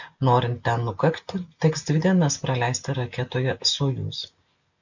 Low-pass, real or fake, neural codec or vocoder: 7.2 kHz; real; none